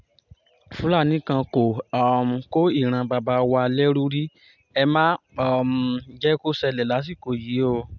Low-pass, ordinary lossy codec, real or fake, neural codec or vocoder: 7.2 kHz; none; real; none